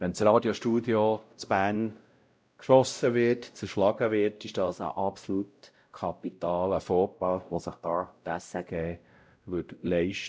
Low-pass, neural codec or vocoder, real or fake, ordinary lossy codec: none; codec, 16 kHz, 0.5 kbps, X-Codec, WavLM features, trained on Multilingual LibriSpeech; fake; none